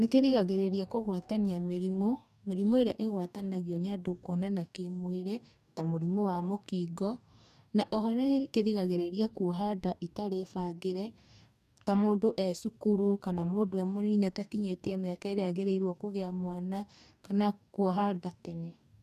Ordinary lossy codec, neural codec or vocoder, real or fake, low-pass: none; codec, 44.1 kHz, 2.6 kbps, DAC; fake; 14.4 kHz